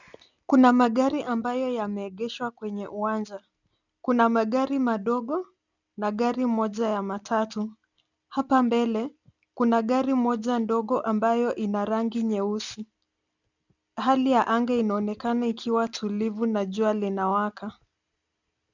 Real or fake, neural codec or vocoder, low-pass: real; none; 7.2 kHz